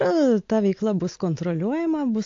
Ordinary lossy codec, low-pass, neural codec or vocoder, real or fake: AAC, 48 kbps; 7.2 kHz; none; real